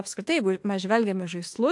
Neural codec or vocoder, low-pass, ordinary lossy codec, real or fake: autoencoder, 48 kHz, 32 numbers a frame, DAC-VAE, trained on Japanese speech; 10.8 kHz; AAC, 64 kbps; fake